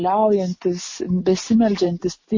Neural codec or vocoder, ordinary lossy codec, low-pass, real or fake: none; MP3, 32 kbps; 7.2 kHz; real